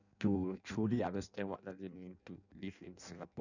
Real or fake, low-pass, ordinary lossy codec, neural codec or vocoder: fake; 7.2 kHz; none; codec, 16 kHz in and 24 kHz out, 0.6 kbps, FireRedTTS-2 codec